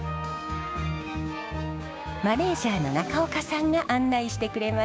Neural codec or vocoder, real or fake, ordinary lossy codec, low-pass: codec, 16 kHz, 6 kbps, DAC; fake; none; none